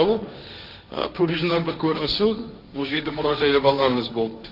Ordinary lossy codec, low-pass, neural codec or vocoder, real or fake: none; 5.4 kHz; codec, 16 kHz, 1.1 kbps, Voila-Tokenizer; fake